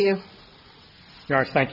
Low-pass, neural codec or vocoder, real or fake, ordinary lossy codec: 5.4 kHz; none; real; Opus, 64 kbps